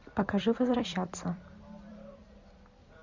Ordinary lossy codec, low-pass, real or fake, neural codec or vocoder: Opus, 64 kbps; 7.2 kHz; fake; vocoder, 44.1 kHz, 128 mel bands every 256 samples, BigVGAN v2